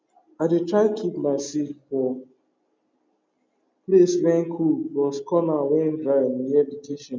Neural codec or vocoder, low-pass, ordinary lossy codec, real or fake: none; none; none; real